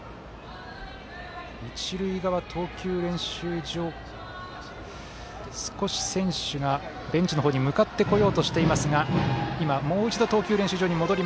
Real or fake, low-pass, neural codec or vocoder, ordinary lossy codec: real; none; none; none